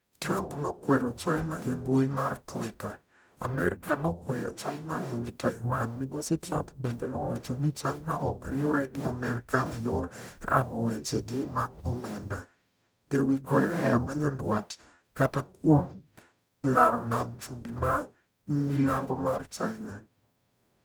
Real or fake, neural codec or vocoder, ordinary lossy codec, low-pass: fake; codec, 44.1 kHz, 0.9 kbps, DAC; none; none